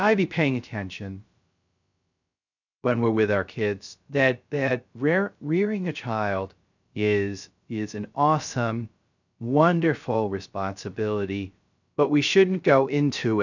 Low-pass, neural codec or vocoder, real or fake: 7.2 kHz; codec, 16 kHz, 0.3 kbps, FocalCodec; fake